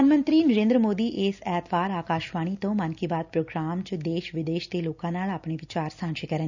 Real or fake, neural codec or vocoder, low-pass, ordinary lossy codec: real; none; 7.2 kHz; none